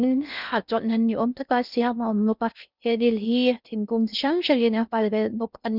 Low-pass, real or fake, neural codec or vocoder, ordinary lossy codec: 5.4 kHz; fake; codec, 16 kHz in and 24 kHz out, 0.6 kbps, FocalCodec, streaming, 2048 codes; none